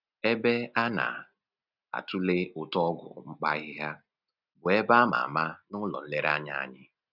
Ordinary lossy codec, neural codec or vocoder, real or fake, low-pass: none; none; real; 5.4 kHz